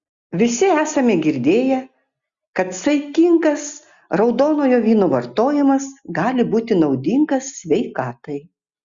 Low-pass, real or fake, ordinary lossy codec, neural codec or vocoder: 7.2 kHz; real; Opus, 64 kbps; none